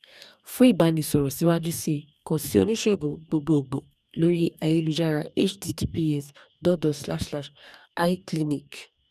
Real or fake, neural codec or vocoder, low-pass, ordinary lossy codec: fake; codec, 44.1 kHz, 2.6 kbps, DAC; 14.4 kHz; none